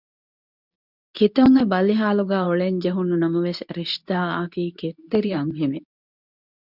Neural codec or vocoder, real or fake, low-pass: codec, 24 kHz, 0.9 kbps, WavTokenizer, medium speech release version 2; fake; 5.4 kHz